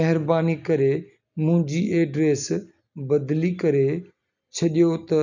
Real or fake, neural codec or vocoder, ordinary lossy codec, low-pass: real; none; none; 7.2 kHz